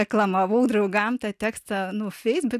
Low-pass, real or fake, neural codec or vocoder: 14.4 kHz; real; none